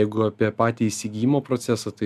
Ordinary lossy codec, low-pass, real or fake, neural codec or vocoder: MP3, 96 kbps; 14.4 kHz; real; none